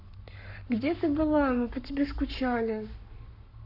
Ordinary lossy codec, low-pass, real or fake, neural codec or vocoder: none; 5.4 kHz; fake; codec, 44.1 kHz, 7.8 kbps, Pupu-Codec